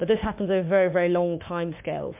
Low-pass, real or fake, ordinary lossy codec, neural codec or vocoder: 3.6 kHz; fake; MP3, 32 kbps; autoencoder, 48 kHz, 32 numbers a frame, DAC-VAE, trained on Japanese speech